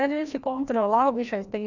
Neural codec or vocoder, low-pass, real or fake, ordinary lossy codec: codec, 16 kHz, 1 kbps, FreqCodec, larger model; 7.2 kHz; fake; none